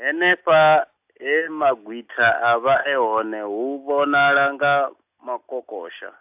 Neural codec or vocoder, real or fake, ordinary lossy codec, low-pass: none; real; AAC, 32 kbps; 3.6 kHz